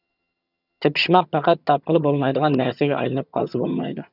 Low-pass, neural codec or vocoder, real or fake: 5.4 kHz; vocoder, 22.05 kHz, 80 mel bands, HiFi-GAN; fake